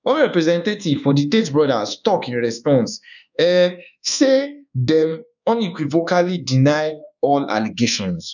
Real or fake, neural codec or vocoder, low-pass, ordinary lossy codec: fake; codec, 24 kHz, 1.2 kbps, DualCodec; 7.2 kHz; none